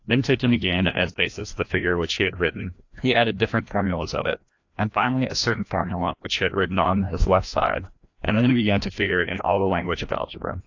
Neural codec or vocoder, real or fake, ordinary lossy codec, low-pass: codec, 16 kHz, 1 kbps, FreqCodec, larger model; fake; AAC, 48 kbps; 7.2 kHz